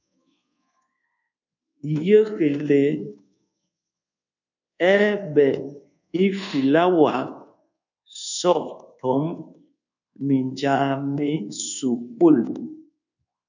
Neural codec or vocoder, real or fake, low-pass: codec, 24 kHz, 1.2 kbps, DualCodec; fake; 7.2 kHz